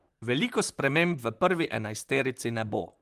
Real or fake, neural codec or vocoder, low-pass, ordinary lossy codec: fake; vocoder, 44.1 kHz, 128 mel bands, Pupu-Vocoder; 14.4 kHz; Opus, 24 kbps